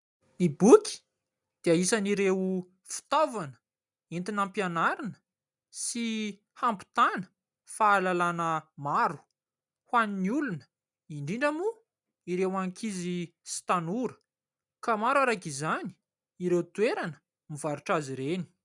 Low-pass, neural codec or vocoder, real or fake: 10.8 kHz; none; real